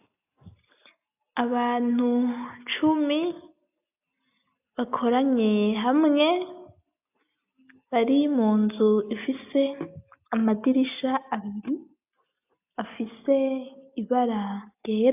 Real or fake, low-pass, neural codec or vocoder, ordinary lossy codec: real; 3.6 kHz; none; AAC, 32 kbps